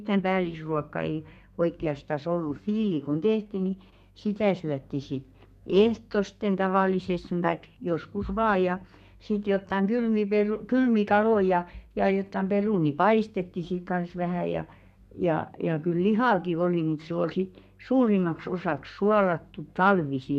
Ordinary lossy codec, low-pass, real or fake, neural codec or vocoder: none; 14.4 kHz; fake; codec, 32 kHz, 1.9 kbps, SNAC